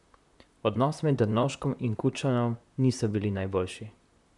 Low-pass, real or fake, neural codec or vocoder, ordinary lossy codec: 10.8 kHz; fake; vocoder, 44.1 kHz, 128 mel bands, Pupu-Vocoder; none